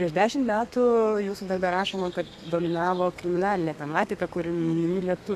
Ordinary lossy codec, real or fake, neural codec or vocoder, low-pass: AAC, 96 kbps; fake; codec, 32 kHz, 1.9 kbps, SNAC; 14.4 kHz